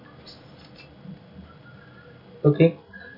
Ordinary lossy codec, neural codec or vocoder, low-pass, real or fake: none; none; 5.4 kHz; real